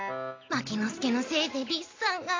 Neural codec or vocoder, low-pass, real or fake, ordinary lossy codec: none; 7.2 kHz; real; AAC, 32 kbps